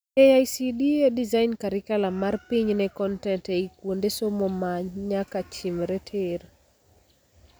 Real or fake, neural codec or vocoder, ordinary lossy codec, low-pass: real; none; none; none